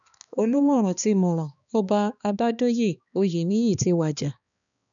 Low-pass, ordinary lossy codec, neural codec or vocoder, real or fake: 7.2 kHz; none; codec, 16 kHz, 2 kbps, X-Codec, HuBERT features, trained on balanced general audio; fake